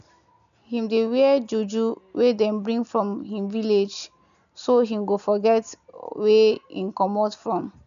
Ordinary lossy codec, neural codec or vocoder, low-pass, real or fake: none; none; 7.2 kHz; real